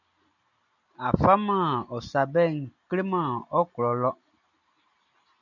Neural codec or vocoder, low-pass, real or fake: none; 7.2 kHz; real